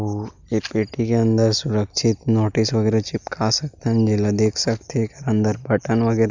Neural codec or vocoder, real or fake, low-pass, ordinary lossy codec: none; real; 7.2 kHz; Opus, 64 kbps